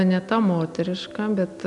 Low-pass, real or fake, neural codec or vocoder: 10.8 kHz; real; none